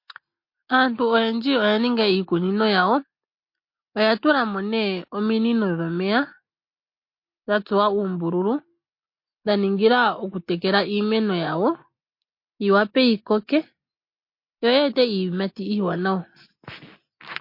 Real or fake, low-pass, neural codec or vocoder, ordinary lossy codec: real; 5.4 kHz; none; MP3, 32 kbps